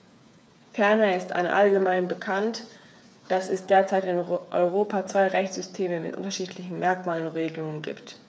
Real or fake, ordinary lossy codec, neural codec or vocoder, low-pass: fake; none; codec, 16 kHz, 8 kbps, FreqCodec, smaller model; none